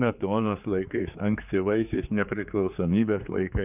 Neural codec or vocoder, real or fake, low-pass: codec, 16 kHz, 4 kbps, X-Codec, HuBERT features, trained on general audio; fake; 3.6 kHz